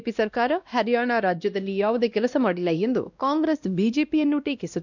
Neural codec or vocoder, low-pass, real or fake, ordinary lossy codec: codec, 16 kHz, 1 kbps, X-Codec, WavLM features, trained on Multilingual LibriSpeech; 7.2 kHz; fake; none